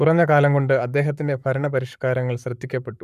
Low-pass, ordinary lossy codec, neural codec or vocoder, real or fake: 14.4 kHz; none; codec, 44.1 kHz, 7.8 kbps, Pupu-Codec; fake